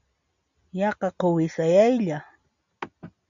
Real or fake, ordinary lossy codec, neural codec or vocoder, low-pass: real; MP3, 96 kbps; none; 7.2 kHz